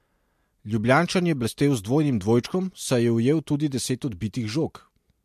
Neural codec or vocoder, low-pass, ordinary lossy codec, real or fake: none; 14.4 kHz; MP3, 64 kbps; real